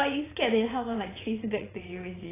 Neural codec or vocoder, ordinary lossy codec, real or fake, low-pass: vocoder, 22.05 kHz, 80 mel bands, WaveNeXt; AAC, 16 kbps; fake; 3.6 kHz